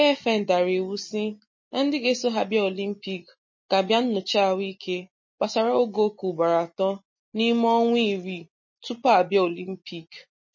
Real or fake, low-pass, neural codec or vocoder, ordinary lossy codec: real; 7.2 kHz; none; MP3, 32 kbps